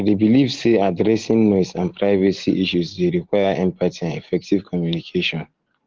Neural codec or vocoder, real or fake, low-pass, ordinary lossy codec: none; real; 7.2 kHz; Opus, 16 kbps